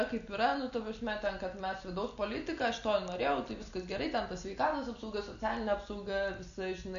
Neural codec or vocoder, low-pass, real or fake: none; 7.2 kHz; real